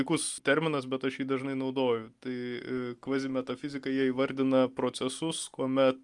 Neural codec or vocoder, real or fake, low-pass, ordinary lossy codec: none; real; 10.8 kHz; Opus, 32 kbps